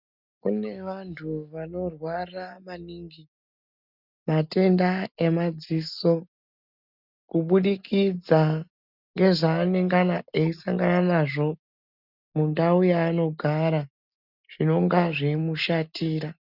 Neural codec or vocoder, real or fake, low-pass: none; real; 5.4 kHz